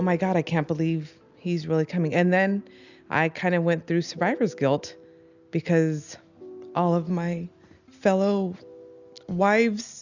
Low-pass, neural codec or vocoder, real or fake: 7.2 kHz; none; real